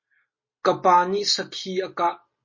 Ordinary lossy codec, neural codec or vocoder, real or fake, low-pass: MP3, 32 kbps; none; real; 7.2 kHz